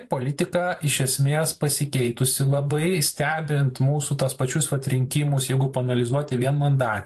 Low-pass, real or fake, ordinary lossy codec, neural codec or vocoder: 14.4 kHz; fake; AAC, 64 kbps; vocoder, 44.1 kHz, 128 mel bands every 512 samples, BigVGAN v2